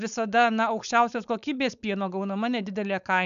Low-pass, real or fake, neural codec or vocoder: 7.2 kHz; fake; codec, 16 kHz, 4.8 kbps, FACodec